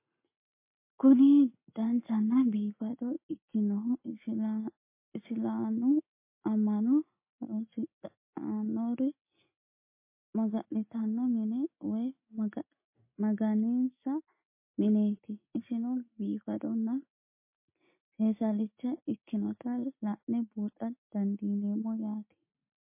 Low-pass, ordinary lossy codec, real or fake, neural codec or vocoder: 3.6 kHz; MP3, 24 kbps; real; none